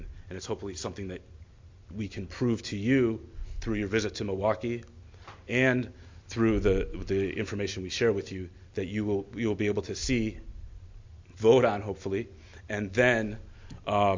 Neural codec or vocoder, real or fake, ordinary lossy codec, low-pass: none; real; MP3, 48 kbps; 7.2 kHz